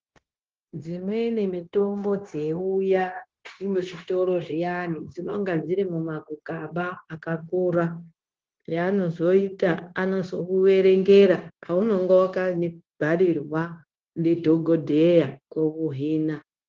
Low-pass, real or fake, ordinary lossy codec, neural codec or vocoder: 7.2 kHz; fake; Opus, 16 kbps; codec, 16 kHz, 0.9 kbps, LongCat-Audio-Codec